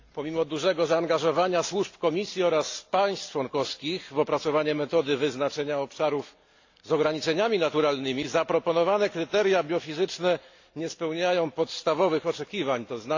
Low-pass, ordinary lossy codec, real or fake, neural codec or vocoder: 7.2 kHz; AAC, 48 kbps; real; none